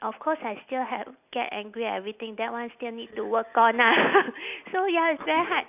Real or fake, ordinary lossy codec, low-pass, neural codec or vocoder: real; none; 3.6 kHz; none